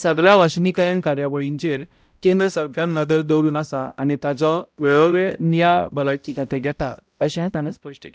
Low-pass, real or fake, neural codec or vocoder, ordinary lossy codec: none; fake; codec, 16 kHz, 0.5 kbps, X-Codec, HuBERT features, trained on balanced general audio; none